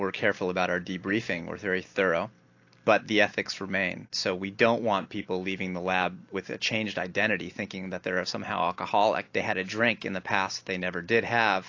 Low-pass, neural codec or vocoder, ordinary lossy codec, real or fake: 7.2 kHz; none; AAC, 48 kbps; real